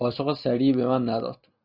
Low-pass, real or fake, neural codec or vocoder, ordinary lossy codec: 5.4 kHz; real; none; Opus, 64 kbps